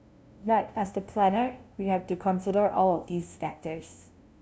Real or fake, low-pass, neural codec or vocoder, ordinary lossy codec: fake; none; codec, 16 kHz, 0.5 kbps, FunCodec, trained on LibriTTS, 25 frames a second; none